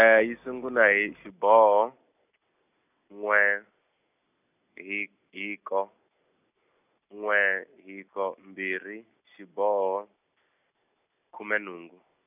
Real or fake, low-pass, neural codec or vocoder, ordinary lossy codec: real; 3.6 kHz; none; none